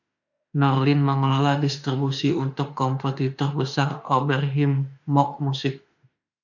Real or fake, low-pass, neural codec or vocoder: fake; 7.2 kHz; autoencoder, 48 kHz, 32 numbers a frame, DAC-VAE, trained on Japanese speech